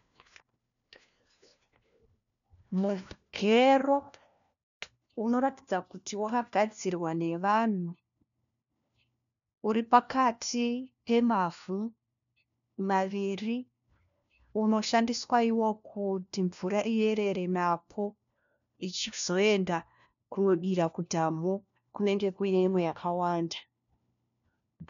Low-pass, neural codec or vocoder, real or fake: 7.2 kHz; codec, 16 kHz, 1 kbps, FunCodec, trained on LibriTTS, 50 frames a second; fake